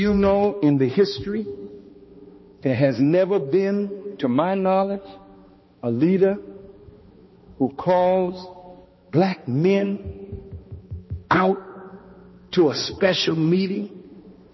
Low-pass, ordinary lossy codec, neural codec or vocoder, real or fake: 7.2 kHz; MP3, 24 kbps; codec, 16 kHz, 2 kbps, X-Codec, HuBERT features, trained on balanced general audio; fake